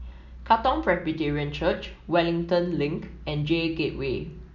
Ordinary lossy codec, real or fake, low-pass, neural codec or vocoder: none; real; 7.2 kHz; none